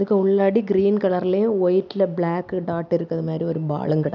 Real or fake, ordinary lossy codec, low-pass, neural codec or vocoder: real; none; 7.2 kHz; none